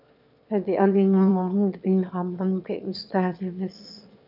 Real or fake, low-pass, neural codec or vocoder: fake; 5.4 kHz; autoencoder, 22.05 kHz, a latent of 192 numbers a frame, VITS, trained on one speaker